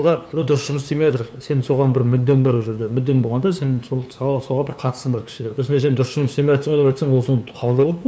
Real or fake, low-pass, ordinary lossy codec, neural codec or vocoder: fake; none; none; codec, 16 kHz, 2 kbps, FunCodec, trained on LibriTTS, 25 frames a second